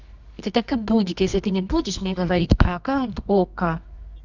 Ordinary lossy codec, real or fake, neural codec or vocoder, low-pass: none; fake; codec, 24 kHz, 0.9 kbps, WavTokenizer, medium music audio release; 7.2 kHz